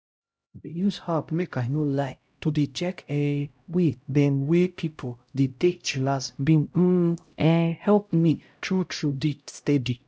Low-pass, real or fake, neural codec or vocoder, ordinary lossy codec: none; fake; codec, 16 kHz, 0.5 kbps, X-Codec, HuBERT features, trained on LibriSpeech; none